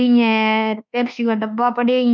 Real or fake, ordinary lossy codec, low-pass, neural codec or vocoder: fake; none; 7.2 kHz; codec, 24 kHz, 1.2 kbps, DualCodec